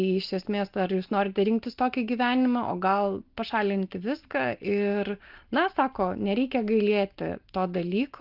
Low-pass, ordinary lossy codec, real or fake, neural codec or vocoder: 5.4 kHz; Opus, 32 kbps; real; none